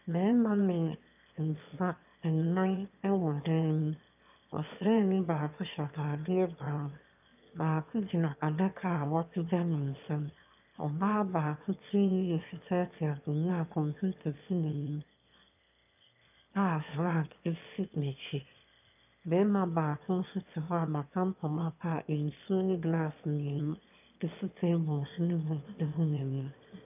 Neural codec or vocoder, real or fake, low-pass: autoencoder, 22.05 kHz, a latent of 192 numbers a frame, VITS, trained on one speaker; fake; 3.6 kHz